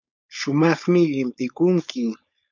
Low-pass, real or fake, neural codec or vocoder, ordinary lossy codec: 7.2 kHz; fake; codec, 16 kHz, 4.8 kbps, FACodec; MP3, 64 kbps